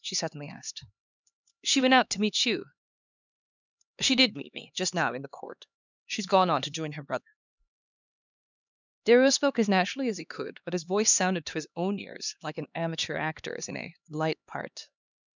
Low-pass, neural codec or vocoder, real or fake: 7.2 kHz; codec, 16 kHz, 2 kbps, X-Codec, HuBERT features, trained on LibriSpeech; fake